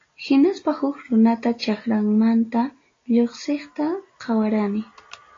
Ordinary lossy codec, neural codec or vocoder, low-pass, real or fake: AAC, 32 kbps; none; 7.2 kHz; real